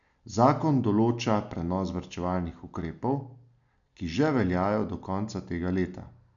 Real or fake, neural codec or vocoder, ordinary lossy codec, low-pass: real; none; none; 7.2 kHz